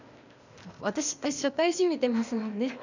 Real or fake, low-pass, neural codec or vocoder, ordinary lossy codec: fake; 7.2 kHz; codec, 16 kHz, 0.8 kbps, ZipCodec; none